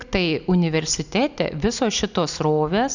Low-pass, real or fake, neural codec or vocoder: 7.2 kHz; real; none